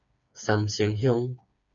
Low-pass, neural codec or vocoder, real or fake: 7.2 kHz; codec, 16 kHz, 4 kbps, FreqCodec, smaller model; fake